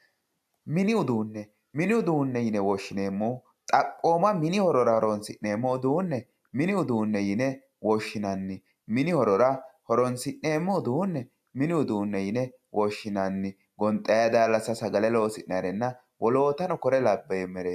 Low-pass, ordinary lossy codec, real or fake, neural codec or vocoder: 14.4 kHz; AAC, 96 kbps; real; none